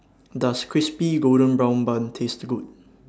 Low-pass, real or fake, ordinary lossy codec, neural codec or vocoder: none; real; none; none